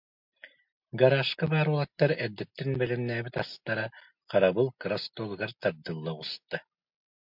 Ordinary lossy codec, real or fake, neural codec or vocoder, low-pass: MP3, 48 kbps; real; none; 5.4 kHz